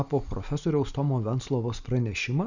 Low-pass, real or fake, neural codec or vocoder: 7.2 kHz; real; none